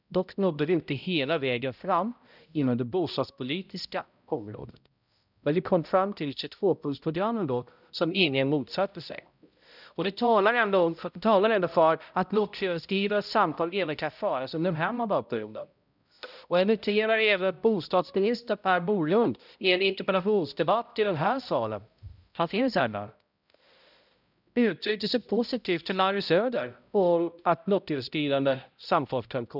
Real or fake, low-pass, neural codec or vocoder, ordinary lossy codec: fake; 5.4 kHz; codec, 16 kHz, 0.5 kbps, X-Codec, HuBERT features, trained on balanced general audio; none